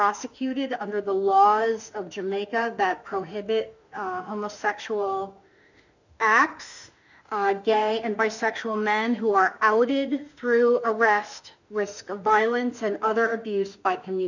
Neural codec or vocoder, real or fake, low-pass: autoencoder, 48 kHz, 32 numbers a frame, DAC-VAE, trained on Japanese speech; fake; 7.2 kHz